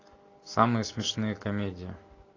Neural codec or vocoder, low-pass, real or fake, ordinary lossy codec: none; 7.2 kHz; real; AAC, 32 kbps